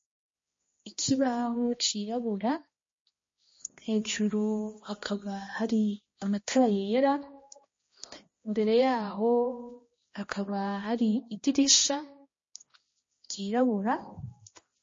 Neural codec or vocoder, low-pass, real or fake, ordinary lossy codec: codec, 16 kHz, 1 kbps, X-Codec, HuBERT features, trained on balanced general audio; 7.2 kHz; fake; MP3, 32 kbps